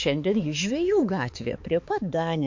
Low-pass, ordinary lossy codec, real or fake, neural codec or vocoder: 7.2 kHz; MP3, 48 kbps; fake; codec, 16 kHz, 4 kbps, X-Codec, HuBERT features, trained on balanced general audio